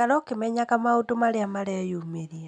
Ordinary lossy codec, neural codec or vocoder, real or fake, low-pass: none; none; real; 9.9 kHz